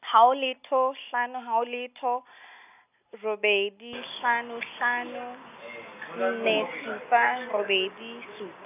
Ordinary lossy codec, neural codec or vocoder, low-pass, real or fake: none; none; 3.6 kHz; real